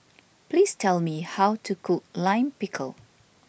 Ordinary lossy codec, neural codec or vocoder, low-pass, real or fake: none; none; none; real